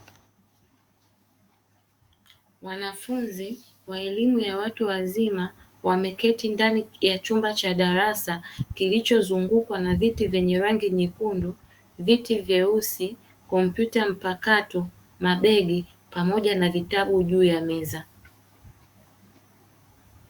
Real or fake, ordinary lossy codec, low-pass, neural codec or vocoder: fake; Opus, 64 kbps; 19.8 kHz; codec, 44.1 kHz, 7.8 kbps, DAC